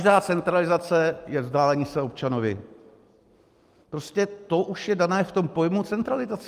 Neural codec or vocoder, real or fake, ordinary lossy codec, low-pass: none; real; Opus, 32 kbps; 14.4 kHz